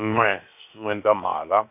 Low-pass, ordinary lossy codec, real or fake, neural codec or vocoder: 3.6 kHz; none; fake; codec, 16 kHz, 0.7 kbps, FocalCodec